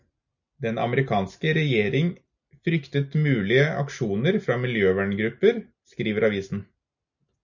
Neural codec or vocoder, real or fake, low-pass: none; real; 7.2 kHz